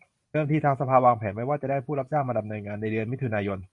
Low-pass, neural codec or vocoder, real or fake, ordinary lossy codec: 10.8 kHz; vocoder, 44.1 kHz, 128 mel bands every 512 samples, BigVGAN v2; fake; MP3, 48 kbps